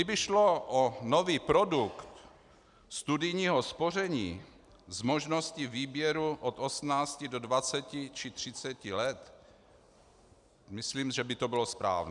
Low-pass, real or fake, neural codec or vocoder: 10.8 kHz; real; none